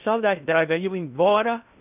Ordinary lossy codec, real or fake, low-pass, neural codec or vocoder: none; fake; 3.6 kHz; codec, 16 kHz in and 24 kHz out, 0.6 kbps, FocalCodec, streaming, 2048 codes